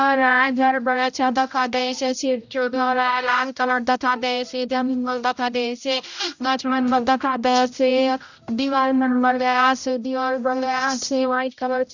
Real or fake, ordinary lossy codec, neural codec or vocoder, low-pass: fake; none; codec, 16 kHz, 0.5 kbps, X-Codec, HuBERT features, trained on general audio; 7.2 kHz